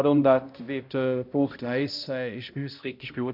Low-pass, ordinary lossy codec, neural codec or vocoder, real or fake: 5.4 kHz; MP3, 48 kbps; codec, 16 kHz, 0.5 kbps, X-Codec, HuBERT features, trained on balanced general audio; fake